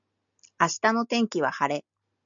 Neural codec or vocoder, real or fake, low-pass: none; real; 7.2 kHz